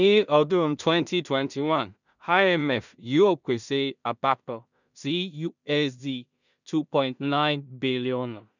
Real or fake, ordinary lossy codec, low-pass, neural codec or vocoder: fake; none; 7.2 kHz; codec, 16 kHz in and 24 kHz out, 0.4 kbps, LongCat-Audio-Codec, two codebook decoder